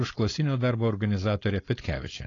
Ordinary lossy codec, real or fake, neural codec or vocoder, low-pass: AAC, 32 kbps; fake; codec, 16 kHz, 4.8 kbps, FACodec; 7.2 kHz